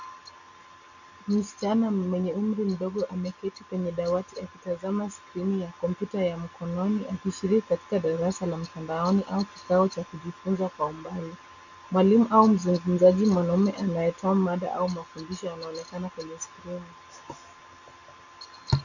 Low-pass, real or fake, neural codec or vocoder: 7.2 kHz; real; none